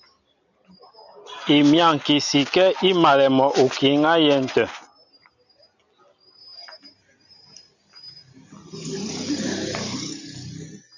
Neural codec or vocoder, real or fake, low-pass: none; real; 7.2 kHz